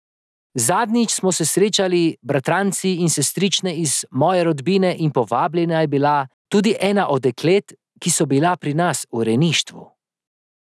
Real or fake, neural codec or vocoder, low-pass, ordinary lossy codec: real; none; none; none